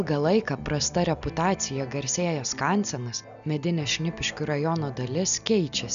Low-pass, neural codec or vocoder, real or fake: 7.2 kHz; none; real